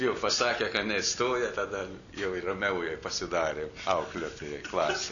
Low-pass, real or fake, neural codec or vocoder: 7.2 kHz; real; none